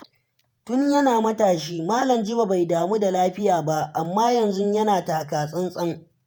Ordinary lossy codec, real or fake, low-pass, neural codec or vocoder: none; fake; none; vocoder, 48 kHz, 128 mel bands, Vocos